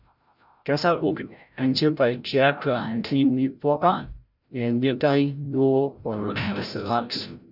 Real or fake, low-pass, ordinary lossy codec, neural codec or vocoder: fake; 5.4 kHz; none; codec, 16 kHz, 0.5 kbps, FreqCodec, larger model